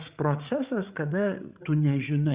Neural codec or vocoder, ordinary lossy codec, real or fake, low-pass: codec, 16 kHz, 8 kbps, FreqCodec, larger model; Opus, 64 kbps; fake; 3.6 kHz